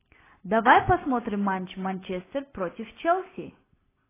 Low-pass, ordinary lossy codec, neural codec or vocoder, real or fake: 3.6 kHz; AAC, 16 kbps; none; real